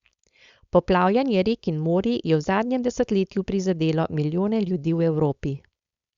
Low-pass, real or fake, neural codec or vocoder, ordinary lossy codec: 7.2 kHz; fake; codec, 16 kHz, 4.8 kbps, FACodec; Opus, 64 kbps